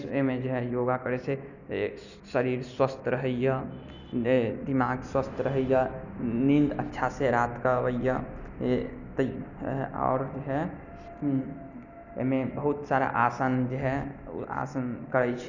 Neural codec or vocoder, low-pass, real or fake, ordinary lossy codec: none; 7.2 kHz; real; none